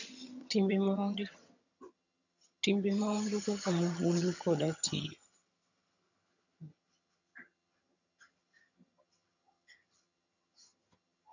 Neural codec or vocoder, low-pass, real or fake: vocoder, 22.05 kHz, 80 mel bands, HiFi-GAN; 7.2 kHz; fake